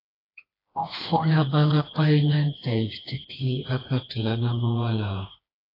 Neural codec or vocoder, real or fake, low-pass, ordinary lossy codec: codec, 16 kHz, 2 kbps, FreqCodec, smaller model; fake; 5.4 kHz; AAC, 24 kbps